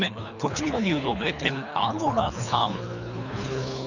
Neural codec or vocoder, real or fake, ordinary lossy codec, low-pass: codec, 24 kHz, 3 kbps, HILCodec; fake; none; 7.2 kHz